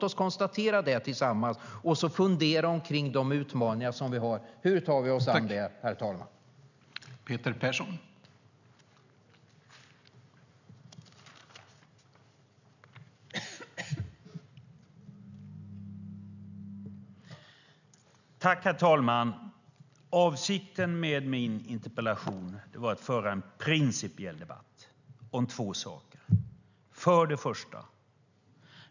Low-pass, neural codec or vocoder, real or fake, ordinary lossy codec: 7.2 kHz; none; real; none